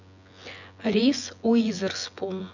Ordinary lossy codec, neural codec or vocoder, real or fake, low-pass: none; vocoder, 24 kHz, 100 mel bands, Vocos; fake; 7.2 kHz